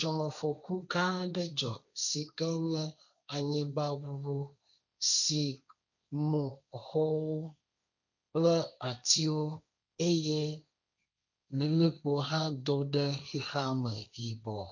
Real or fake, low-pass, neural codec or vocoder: fake; 7.2 kHz; codec, 16 kHz, 1.1 kbps, Voila-Tokenizer